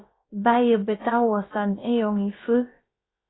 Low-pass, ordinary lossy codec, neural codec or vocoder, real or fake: 7.2 kHz; AAC, 16 kbps; codec, 16 kHz, about 1 kbps, DyCAST, with the encoder's durations; fake